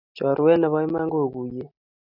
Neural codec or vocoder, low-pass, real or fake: none; 5.4 kHz; real